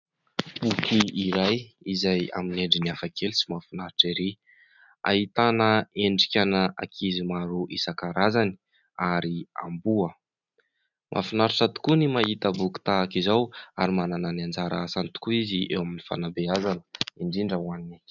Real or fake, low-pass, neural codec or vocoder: real; 7.2 kHz; none